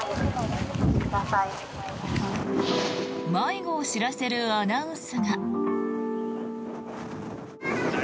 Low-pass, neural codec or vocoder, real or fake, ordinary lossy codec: none; none; real; none